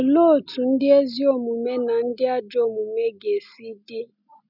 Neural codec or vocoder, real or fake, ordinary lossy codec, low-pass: none; real; none; 5.4 kHz